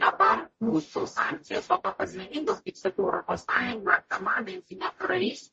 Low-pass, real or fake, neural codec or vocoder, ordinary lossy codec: 10.8 kHz; fake; codec, 44.1 kHz, 0.9 kbps, DAC; MP3, 32 kbps